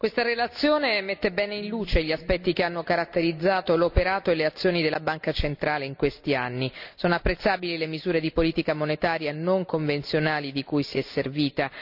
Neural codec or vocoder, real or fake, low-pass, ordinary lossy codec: none; real; 5.4 kHz; none